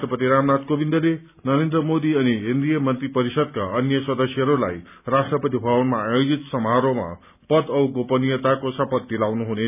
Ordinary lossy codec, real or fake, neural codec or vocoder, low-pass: none; real; none; 3.6 kHz